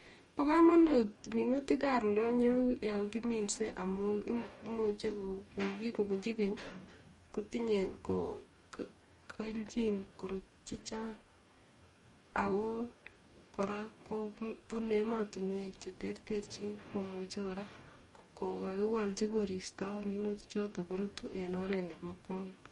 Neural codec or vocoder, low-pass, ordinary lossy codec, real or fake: codec, 44.1 kHz, 2.6 kbps, DAC; 19.8 kHz; MP3, 48 kbps; fake